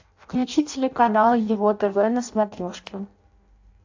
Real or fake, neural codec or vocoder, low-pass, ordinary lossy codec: fake; codec, 16 kHz in and 24 kHz out, 0.6 kbps, FireRedTTS-2 codec; 7.2 kHz; AAC, 48 kbps